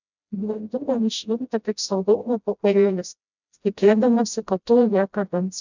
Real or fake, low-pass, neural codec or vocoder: fake; 7.2 kHz; codec, 16 kHz, 0.5 kbps, FreqCodec, smaller model